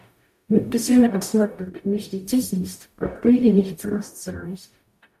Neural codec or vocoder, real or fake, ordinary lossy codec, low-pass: codec, 44.1 kHz, 0.9 kbps, DAC; fake; MP3, 96 kbps; 14.4 kHz